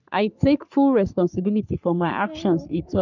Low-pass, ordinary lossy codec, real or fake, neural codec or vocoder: 7.2 kHz; none; fake; codec, 44.1 kHz, 3.4 kbps, Pupu-Codec